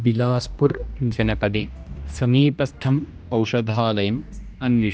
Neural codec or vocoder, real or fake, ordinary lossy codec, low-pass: codec, 16 kHz, 1 kbps, X-Codec, HuBERT features, trained on general audio; fake; none; none